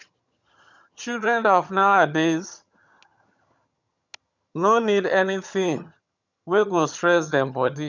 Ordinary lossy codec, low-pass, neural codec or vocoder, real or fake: none; 7.2 kHz; vocoder, 22.05 kHz, 80 mel bands, HiFi-GAN; fake